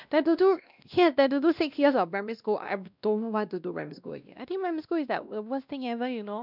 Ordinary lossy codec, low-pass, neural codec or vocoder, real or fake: none; 5.4 kHz; codec, 16 kHz, 1 kbps, X-Codec, WavLM features, trained on Multilingual LibriSpeech; fake